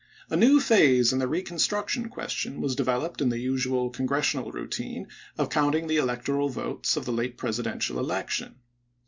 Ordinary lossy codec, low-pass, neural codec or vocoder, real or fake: MP3, 64 kbps; 7.2 kHz; none; real